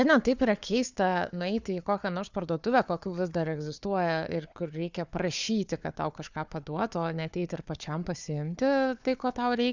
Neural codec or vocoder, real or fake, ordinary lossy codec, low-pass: codec, 16 kHz, 4 kbps, FunCodec, trained on Chinese and English, 50 frames a second; fake; Opus, 64 kbps; 7.2 kHz